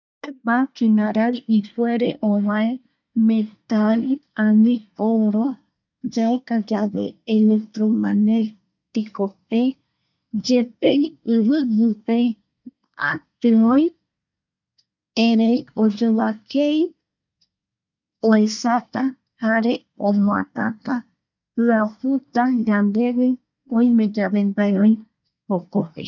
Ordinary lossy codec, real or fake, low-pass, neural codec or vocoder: none; fake; 7.2 kHz; codec, 24 kHz, 1 kbps, SNAC